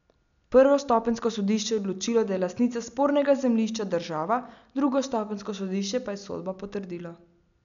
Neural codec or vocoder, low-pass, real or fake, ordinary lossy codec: none; 7.2 kHz; real; none